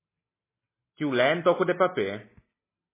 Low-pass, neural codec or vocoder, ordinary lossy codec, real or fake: 3.6 kHz; none; MP3, 16 kbps; real